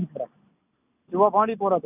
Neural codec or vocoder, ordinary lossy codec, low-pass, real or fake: none; none; 3.6 kHz; real